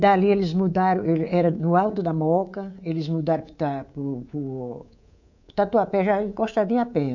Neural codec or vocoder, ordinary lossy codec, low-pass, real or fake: codec, 24 kHz, 3.1 kbps, DualCodec; none; 7.2 kHz; fake